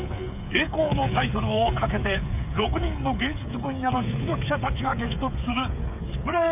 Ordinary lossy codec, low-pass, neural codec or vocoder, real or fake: none; 3.6 kHz; codec, 16 kHz, 8 kbps, FreqCodec, smaller model; fake